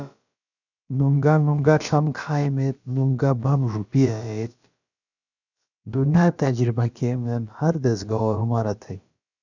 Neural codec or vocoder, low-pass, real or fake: codec, 16 kHz, about 1 kbps, DyCAST, with the encoder's durations; 7.2 kHz; fake